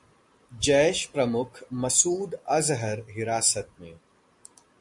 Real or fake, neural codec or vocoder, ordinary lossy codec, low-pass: real; none; MP3, 64 kbps; 10.8 kHz